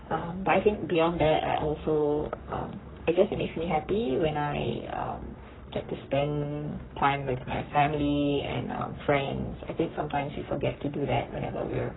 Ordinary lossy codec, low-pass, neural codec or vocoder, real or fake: AAC, 16 kbps; 7.2 kHz; codec, 44.1 kHz, 3.4 kbps, Pupu-Codec; fake